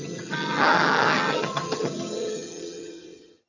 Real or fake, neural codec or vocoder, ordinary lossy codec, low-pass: fake; vocoder, 22.05 kHz, 80 mel bands, HiFi-GAN; none; 7.2 kHz